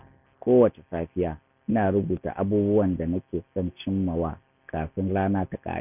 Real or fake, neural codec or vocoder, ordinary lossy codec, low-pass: real; none; none; 3.6 kHz